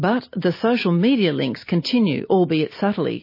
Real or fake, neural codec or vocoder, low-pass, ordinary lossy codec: real; none; 5.4 kHz; MP3, 24 kbps